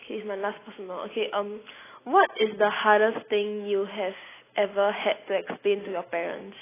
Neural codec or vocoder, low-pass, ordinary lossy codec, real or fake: none; 3.6 kHz; AAC, 16 kbps; real